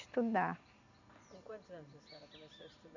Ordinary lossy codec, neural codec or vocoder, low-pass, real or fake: none; none; 7.2 kHz; real